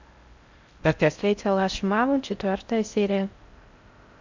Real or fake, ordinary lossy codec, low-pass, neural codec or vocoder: fake; MP3, 64 kbps; 7.2 kHz; codec, 16 kHz in and 24 kHz out, 0.6 kbps, FocalCodec, streaming, 4096 codes